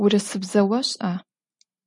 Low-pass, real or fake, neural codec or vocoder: 10.8 kHz; real; none